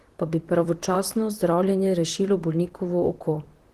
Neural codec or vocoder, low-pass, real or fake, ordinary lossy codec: vocoder, 44.1 kHz, 128 mel bands, Pupu-Vocoder; 14.4 kHz; fake; Opus, 16 kbps